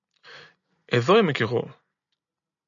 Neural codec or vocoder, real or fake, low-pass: none; real; 7.2 kHz